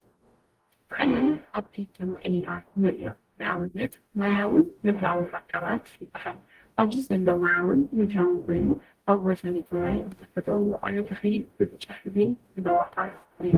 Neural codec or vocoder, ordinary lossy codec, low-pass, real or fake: codec, 44.1 kHz, 0.9 kbps, DAC; Opus, 24 kbps; 14.4 kHz; fake